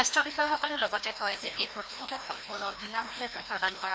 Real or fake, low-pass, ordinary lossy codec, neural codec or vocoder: fake; none; none; codec, 16 kHz, 1 kbps, FreqCodec, larger model